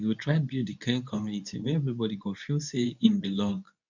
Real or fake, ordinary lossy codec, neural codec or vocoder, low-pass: fake; none; codec, 24 kHz, 0.9 kbps, WavTokenizer, medium speech release version 2; 7.2 kHz